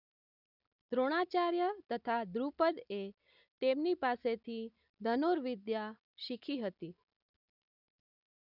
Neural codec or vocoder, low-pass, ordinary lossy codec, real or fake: none; 5.4 kHz; none; real